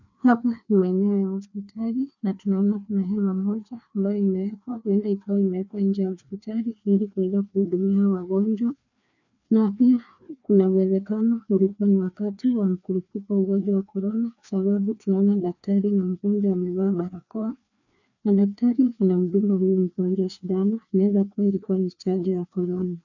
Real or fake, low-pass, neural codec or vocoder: fake; 7.2 kHz; codec, 16 kHz, 2 kbps, FreqCodec, larger model